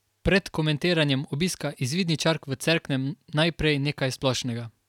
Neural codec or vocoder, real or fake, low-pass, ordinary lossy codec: none; real; 19.8 kHz; none